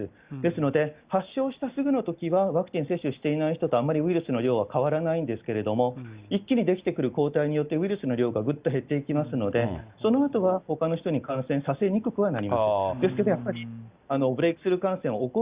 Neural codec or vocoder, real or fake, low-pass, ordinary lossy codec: none; real; 3.6 kHz; Opus, 64 kbps